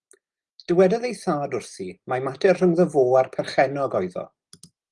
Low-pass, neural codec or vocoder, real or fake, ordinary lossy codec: 10.8 kHz; none; real; Opus, 24 kbps